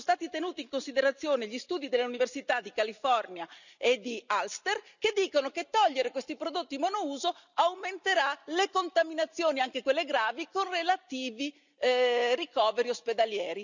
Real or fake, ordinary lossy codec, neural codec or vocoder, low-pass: real; none; none; 7.2 kHz